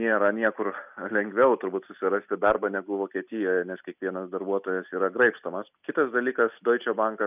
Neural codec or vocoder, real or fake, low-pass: none; real; 3.6 kHz